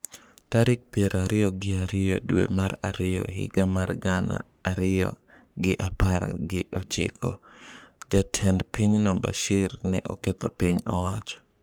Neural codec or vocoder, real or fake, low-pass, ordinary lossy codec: codec, 44.1 kHz, 3.4 kbps, Pupu-Codec; fake; none; none